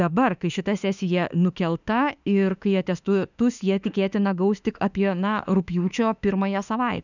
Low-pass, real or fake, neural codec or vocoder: 7.2 kHz; fake; autoencoder, 48 kHz, 32 numbers a frame, DAC-VAE, trained on Japanese speech